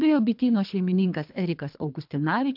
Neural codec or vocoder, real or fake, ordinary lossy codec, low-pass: codec, 24 kHz, 3 kbps, HILCodec; fake; MP3, 48 kbps; 5.4 kHz